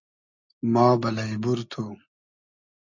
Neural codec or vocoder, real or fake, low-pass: none; real; 7.2 kHz